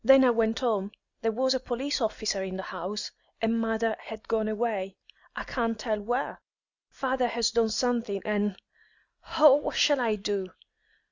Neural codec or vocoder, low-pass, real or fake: none; 7.2 kHz; real